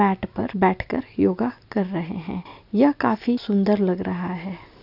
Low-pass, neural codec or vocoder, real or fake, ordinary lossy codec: 5.4 kHz; none; real; none